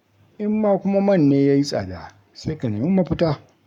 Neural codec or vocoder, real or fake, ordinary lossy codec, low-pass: codec, 44.1 kHz, 7.8 kbps, Pupu-Codec; fake; none; 19.8 kHz